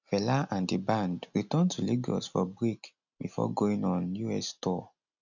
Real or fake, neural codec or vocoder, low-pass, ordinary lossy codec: real; none; 7.2 kHz; none